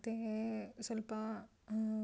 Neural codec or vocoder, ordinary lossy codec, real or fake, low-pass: none; none; real; none